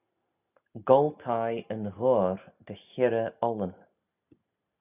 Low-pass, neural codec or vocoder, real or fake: 3.6 kHz; none; real